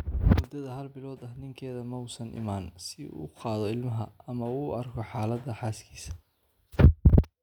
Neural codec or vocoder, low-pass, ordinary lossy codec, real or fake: none; 19.8 kHz; none; real